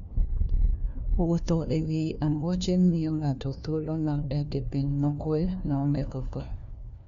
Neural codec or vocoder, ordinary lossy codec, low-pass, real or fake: codec, 16 kHz, 1 kbps, FunCodec, trained on LibriTTS, 50 frames a second; none; 7.2 kHz; fake